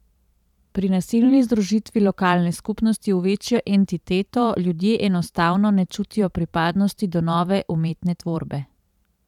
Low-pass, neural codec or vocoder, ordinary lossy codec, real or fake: 19.8 kHz; vocoder, 44.1 kHz, 128 mel bands every 512 samples, BigVGAN v2; none; fake